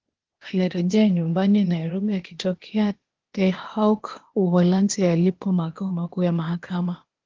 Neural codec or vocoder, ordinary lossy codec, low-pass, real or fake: codec, 16 kHz, 0.8 kbps, ZipCodec; Opus, 16 kbps; 7.2 kHz; fake